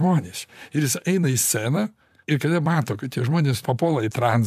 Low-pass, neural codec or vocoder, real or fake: 14.4 kHz; vocoder, 44.1 kHz, 128 mel bands, Pupu-Vocoder; fake